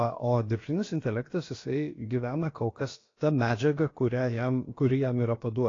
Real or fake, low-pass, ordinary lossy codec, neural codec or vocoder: fake; 7.2 kHz; AAC, 32 kbps; codec, 16 kHz, about 1 kbps, DyCAST, with the encoder's durations